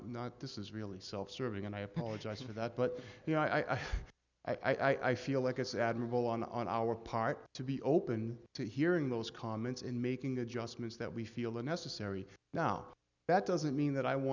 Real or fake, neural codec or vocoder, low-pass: real; none; 7.2 kHz